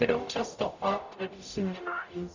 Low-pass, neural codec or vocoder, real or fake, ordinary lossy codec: 7.2 kHz; codec, 44.1 kHz, 0.9 kbps, DAC; fake; Opus, 64 kbps